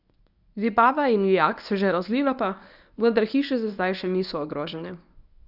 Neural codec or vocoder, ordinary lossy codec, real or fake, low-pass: codec, 24 kHz, 0.9 kbps, WavTokenizer, medium speech release version 1; none; fake; 5.4 kHz